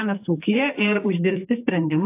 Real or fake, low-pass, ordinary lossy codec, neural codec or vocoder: fake; 3.6 kHz; AAC, 24 kbps; codec, 44.1 kHz, 2.6 kbps, SNAC